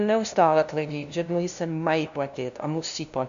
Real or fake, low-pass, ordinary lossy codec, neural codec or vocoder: fake; 7.2 kHz; AAC, 96 kbps; codec, 16 kHz, 0.5 kbps, FunCodec, trained on LibriTTS, 25 frames a second